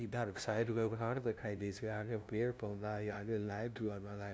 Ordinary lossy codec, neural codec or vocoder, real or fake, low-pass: none; codec, 16 kHz, 0.5 kbps, FunCodec, trained on LibriTTS, 25 frames a second; fake; none